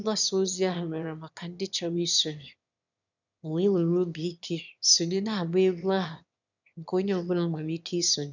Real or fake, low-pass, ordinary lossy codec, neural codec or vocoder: fake; 7.2 kHz; none; autoencoder, 22.05 kHz, a latent of 192 numbers a frame, VITS, trained on one speaker